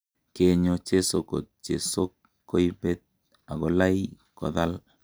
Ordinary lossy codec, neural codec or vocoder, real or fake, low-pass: none; none; real; none